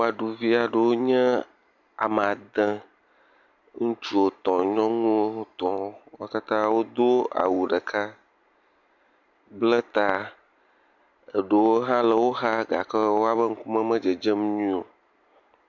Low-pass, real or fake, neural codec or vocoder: 7.2 kHz; real; none